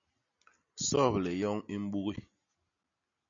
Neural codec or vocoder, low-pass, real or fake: none; 7.2 kHz; real